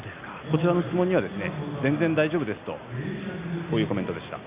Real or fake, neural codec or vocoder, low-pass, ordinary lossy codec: real; none; 3.6 kHz; Opus, 64 kbps